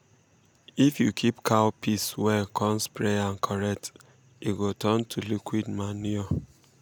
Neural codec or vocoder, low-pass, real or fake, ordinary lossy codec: none; none; real; none